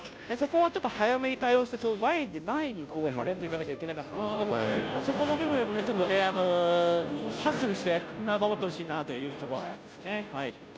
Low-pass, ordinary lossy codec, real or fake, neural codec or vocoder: none; none; fake; codec, 16 kHz, 0.5 kbps, FunCodec, trained on Chinese and English, 25 frames a second